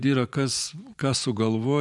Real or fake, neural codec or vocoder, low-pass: fake; vocoder, 24 kHz, 100 mel bands, Vocos; 10.8 kHz